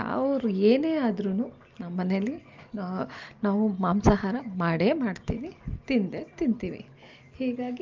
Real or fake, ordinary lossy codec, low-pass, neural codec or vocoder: real; Opus, 24 kbps; 7.2 kHz; none